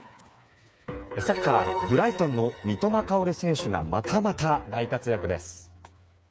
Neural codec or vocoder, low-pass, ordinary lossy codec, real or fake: codec, 16 kHz, 4 kbps, FreqCodec, smaller model; none; none; fake